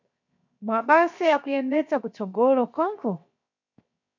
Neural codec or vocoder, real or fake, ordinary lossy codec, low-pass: codec, 16 kHz, 0.7 kbps, FocalCodec; fake; MP3, 64 kbps; 7.2 kHz